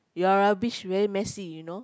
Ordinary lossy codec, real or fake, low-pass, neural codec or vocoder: none; real; none; none